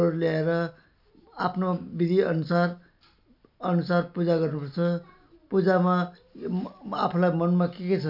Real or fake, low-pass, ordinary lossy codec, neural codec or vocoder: real; 5.4 kHz; none; none